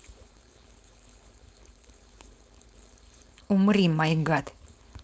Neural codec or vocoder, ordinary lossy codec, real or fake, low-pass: codec, 16 kHz, 4.8 kbps, FACodec; none; fake; none